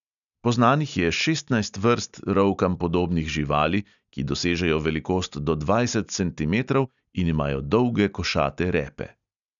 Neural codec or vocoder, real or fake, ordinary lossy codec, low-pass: none; real; none; 7.2 kHz